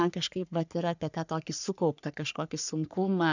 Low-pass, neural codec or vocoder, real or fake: 7.2 kHz; codec, 44.1 kHz, 3.4 kbps, Pupu-Codec; fake